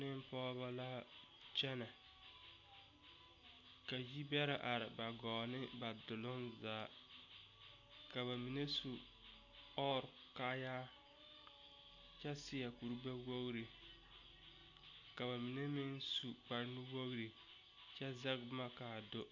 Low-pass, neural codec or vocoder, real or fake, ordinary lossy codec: 7.2 kHz; none; real; AAC, 48 kbps